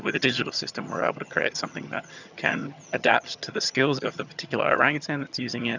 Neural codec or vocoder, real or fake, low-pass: vocoder, 22.05 kHz, 80 mel bands, HiFi-GAN; fake; 7.2 kHz